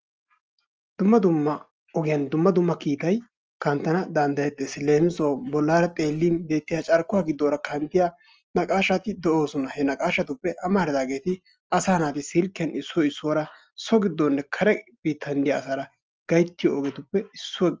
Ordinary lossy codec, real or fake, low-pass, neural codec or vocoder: Opus, 24 kbps; real; 7.2 kHz; none